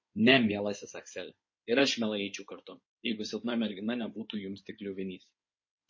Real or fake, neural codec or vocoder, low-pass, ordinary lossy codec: fake; codec, 16 kHz in and 24 kHz out, 2.2 kbps, FireRedTTS-2 codec; 7.2 kHz; MP3, 32 kbps